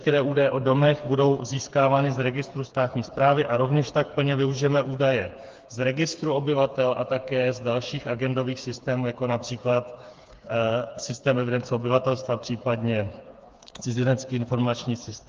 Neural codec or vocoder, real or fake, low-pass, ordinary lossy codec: codec, 16 kHz, 4 kbps, FreqCodec, smaller model; fake; 7.2 kHz; Opus, 24 kbps